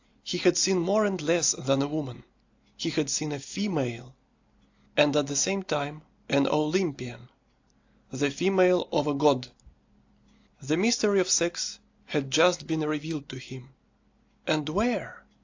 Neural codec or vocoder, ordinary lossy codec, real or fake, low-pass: none; AAC, 48 kbps; real; 7.2 kHz